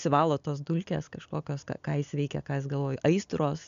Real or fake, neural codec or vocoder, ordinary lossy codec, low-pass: real; none; MP3, 64 kbps; 7.2 kHz